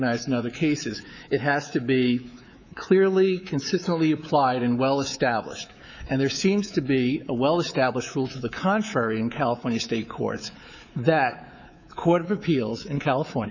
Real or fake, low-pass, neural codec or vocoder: fake; 7.2 kHz; codec, 24 kHz, 3.1 kbps, DualCodec